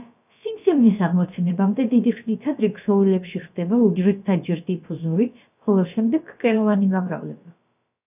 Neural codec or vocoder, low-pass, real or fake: codec, 16 kHz, about 1 kbps, DyCAST, with the encoder's durations; 3.6 kHz; fake